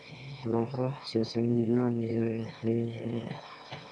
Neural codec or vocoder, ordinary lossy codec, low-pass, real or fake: autoencoder, 22.05 kHz, a latent of 192 numbers a frame, VITS, trained on one speaker; none; none; fake